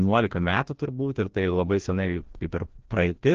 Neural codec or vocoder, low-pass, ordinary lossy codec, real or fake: codec, 16 kHz, 1 kbps, FreqCodec, larger model; 7.2 kHz; Opus, 16 kbps; fake